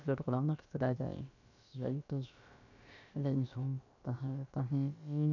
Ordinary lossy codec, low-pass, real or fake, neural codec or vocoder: none; 7.2 kHz; fake; codec, 16 kHz, about 1 kbps, DyCAST, with the encoder's durations